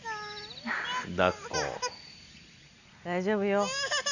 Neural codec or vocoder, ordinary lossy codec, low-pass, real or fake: none; none; 7.2 kHz; real